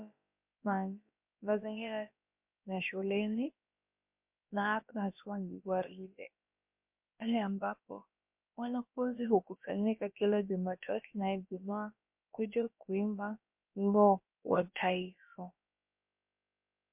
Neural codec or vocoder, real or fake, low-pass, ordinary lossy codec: codec, 16 kHz, about 1 kbps, DyCAST, with the encoder's durations; fake; 3.6 kHz; MP3, 32 kbps